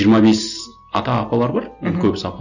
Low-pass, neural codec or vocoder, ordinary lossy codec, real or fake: 7.2 kHz; none; none; real